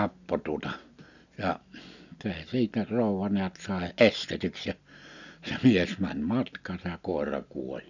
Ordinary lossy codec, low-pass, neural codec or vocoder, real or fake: none; 7.2 kHz; none; real